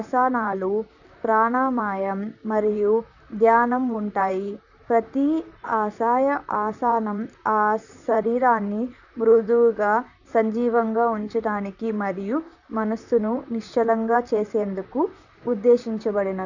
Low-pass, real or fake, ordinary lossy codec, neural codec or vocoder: 7.2 kHz; fake; none; vocoder, 44.1 kHz, 128 mel bands, Pupu-Vocoder